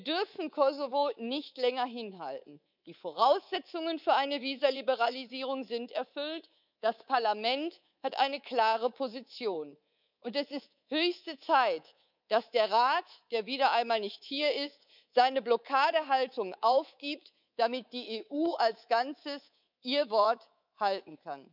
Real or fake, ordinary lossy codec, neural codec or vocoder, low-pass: fake; none; codec, 24 kHz, 3.1 kbps, DualCodec; 5.4 kHz